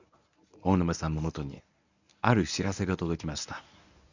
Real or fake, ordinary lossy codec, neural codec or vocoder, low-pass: fake; none; codec, 24 kHz, 0.9 kbps, WavTokenizer, medium speech release version 1; 7.2 kHz